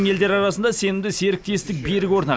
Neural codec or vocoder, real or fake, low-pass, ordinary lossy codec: none; real; none; none